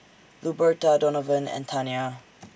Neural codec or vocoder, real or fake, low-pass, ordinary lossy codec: none; real; none; none